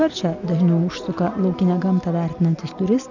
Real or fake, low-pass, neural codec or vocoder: real; 7.2 kHz; none